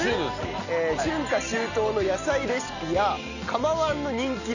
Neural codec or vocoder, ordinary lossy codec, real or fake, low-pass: none; none; real; 7.2 kHz